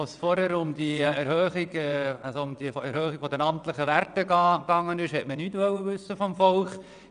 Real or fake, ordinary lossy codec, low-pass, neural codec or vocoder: fake; none; 9.9 kHz; vocoder, 22.05 kHz, 80 mel bands, WaveNeXt